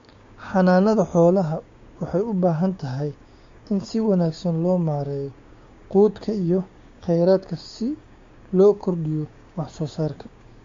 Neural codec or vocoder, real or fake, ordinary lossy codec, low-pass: codec, 16 kHz, 6 kbps, DAC; fake; MP3, 48 kbps; 7.2 kHz